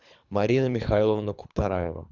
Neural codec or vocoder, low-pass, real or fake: codec, 24 kHz, 3 kbps, HILCodec; 7.2 kHz; fake